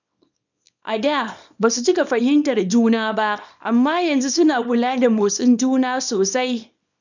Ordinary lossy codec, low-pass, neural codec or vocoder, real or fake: none; 7.2 kHz; codec, 24 kHz, 0.9 kbps, WavTokenizer, small release; fake